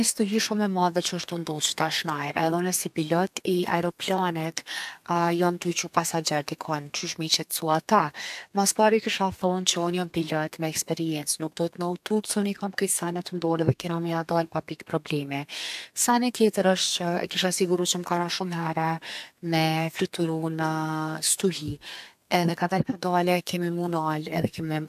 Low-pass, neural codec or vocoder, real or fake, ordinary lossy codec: 14.4 kHz; codec, 32 kHz, 1.9 kbps, SNAC; fake; AAC, 96 kbps